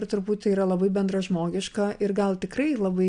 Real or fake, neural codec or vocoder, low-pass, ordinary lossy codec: real; none; 9.9 kHz; AAC, 64 kbps